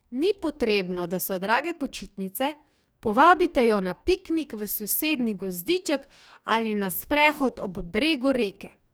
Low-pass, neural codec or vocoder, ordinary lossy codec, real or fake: none; codec, 44.1 kHz, 2.6 kbps, DAC; none; fake